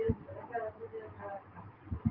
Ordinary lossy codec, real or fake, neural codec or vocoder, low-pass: Opus, 32 kbps; real; none; 5.4 kHz